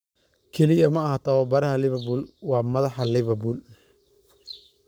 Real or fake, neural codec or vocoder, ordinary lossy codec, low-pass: fake; codec, 44.1 kHz, 7.8 kbps, Pupu-Codec; none; none